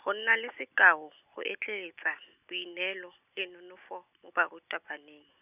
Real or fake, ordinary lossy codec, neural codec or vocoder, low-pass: real; none; none; 3.6 kHz